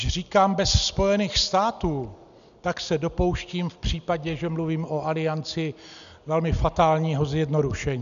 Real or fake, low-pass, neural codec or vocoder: real; 7.2 kHz; none